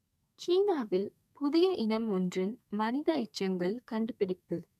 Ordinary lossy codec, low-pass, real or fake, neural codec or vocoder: none; 14.4 kHz; fake; codec, 32 kHz, 1.9 kbps, SNAC